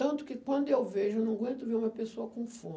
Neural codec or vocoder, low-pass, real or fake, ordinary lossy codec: none; none; real; none